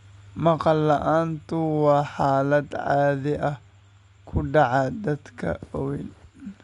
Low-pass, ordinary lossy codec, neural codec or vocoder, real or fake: 10.8 kHz; Opus, 64 kbps; none; real